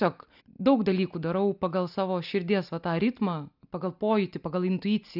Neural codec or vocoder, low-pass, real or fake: none; 5.4 kHz; real